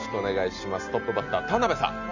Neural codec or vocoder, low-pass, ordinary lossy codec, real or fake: none; 7.2 kHz; none; real